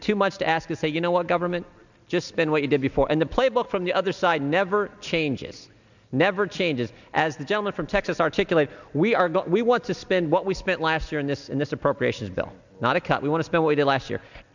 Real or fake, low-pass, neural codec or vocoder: real; 7.2 kHz; none